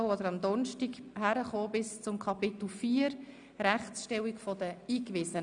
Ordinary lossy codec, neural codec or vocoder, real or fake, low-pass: none; none; real; 9.9 kHz